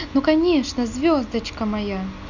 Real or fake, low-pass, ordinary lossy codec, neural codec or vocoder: real; 7.2 kHz; none; none